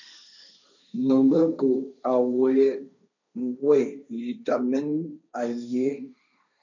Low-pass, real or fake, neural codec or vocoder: 7.2 kHz; fake; codec, 16 kHz, 1.1 kbps, Voila-Tokenizer